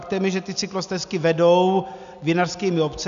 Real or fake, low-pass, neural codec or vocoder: real; 7.2 kHz; none